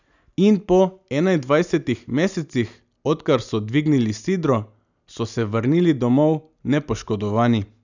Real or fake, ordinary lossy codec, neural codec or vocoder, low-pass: real; none; none; 7.2 kHz